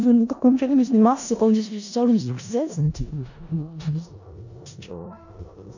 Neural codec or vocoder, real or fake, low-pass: codec, 16 kHz in and 24 kHz out, 0.4 kbps, LongCat-Audio-Codec, four codebook decoder; fake; 7.2 kHz